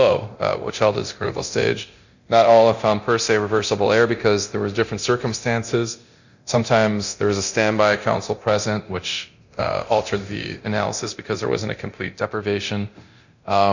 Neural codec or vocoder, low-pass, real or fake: codec, 24 kHz, 0.9 kbps, DualCodec; 7.2 kHz; fake